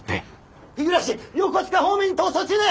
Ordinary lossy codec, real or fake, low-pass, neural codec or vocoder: none; real; none; none